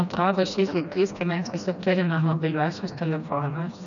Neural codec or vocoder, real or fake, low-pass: codec, 16 kHz, 1 kbps, FreqCodec, smaller model; fake; 7.2 kHz